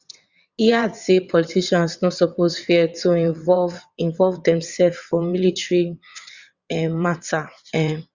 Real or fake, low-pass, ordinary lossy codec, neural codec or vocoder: fake; 7.2 kHz; Opus, 64 kbps; vocoder, 22.05 kHz, 80 mel bands, WaveNeXt